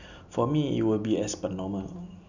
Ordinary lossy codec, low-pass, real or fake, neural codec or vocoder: none; 7.2 kHz; real; none